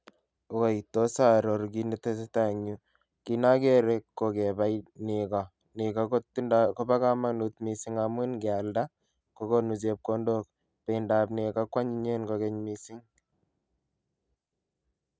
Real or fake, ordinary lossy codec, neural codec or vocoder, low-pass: real; none; none; none